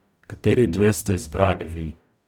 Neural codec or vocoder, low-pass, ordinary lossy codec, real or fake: codec, 44.1 kHz, 0.9 kbps, DAC; 19.8 kHz; none; fake